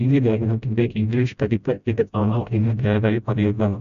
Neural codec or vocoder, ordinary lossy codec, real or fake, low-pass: codec, 16 kHz, 0.5 kbps, FreqCodec, smaller model; none; fake; 7.2 kHz